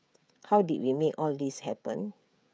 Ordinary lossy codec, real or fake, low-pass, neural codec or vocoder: none; fake; none; codec, 16 kHz, 16 kbps, FreqCodec, smaller model